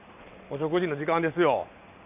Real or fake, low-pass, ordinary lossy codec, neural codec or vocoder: fake; 3.6 kHz; none; vocoder, 44.1 kHz, 128 mel bands every 512 samples, BigVGAN v2